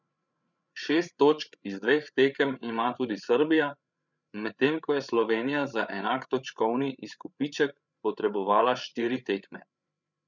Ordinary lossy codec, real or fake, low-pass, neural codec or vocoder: none; fake; 7.2 kHz; codec, 16 kHz, 8 kbps, FreqCodec, larger model